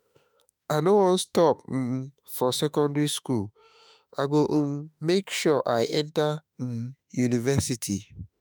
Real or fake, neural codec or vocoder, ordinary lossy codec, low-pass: fake; autoencoder, 48 kHz, 32 numbers a frame, DAC-VAE, trained on Japanese speech; none; none